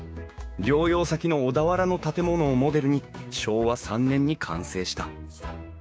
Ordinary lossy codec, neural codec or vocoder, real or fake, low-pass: none; codec, 16 kHz, 6 kbps, DAC; fake; none